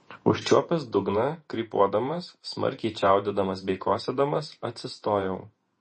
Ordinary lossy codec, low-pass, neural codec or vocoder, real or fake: MP3, 32 kbps; 10.8 kHz; vocoder, 48 kHz, 128 mel bands, Vocos; fake